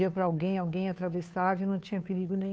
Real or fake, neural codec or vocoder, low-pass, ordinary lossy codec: fake; codec, 16 kHz, 2 kbps, FunCodec, trained on Chinese and English, 25 frames a second; none; none